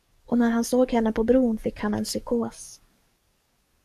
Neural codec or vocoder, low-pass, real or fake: codec, 44.1 kHz, 7.8 kbps, DAC; 14.4 kHz; fake